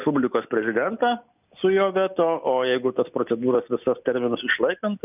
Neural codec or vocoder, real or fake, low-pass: none; real; 3.6 kHz